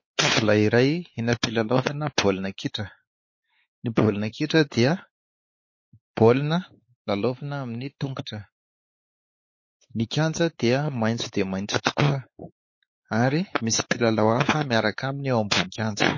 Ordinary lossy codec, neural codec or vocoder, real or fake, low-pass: MP3, 32 kbps; codec, 16 kHz, 4 kbps, X-Codec, WavLM features, trained on Multilingual LibriSpeech; fake; 7.2 kHz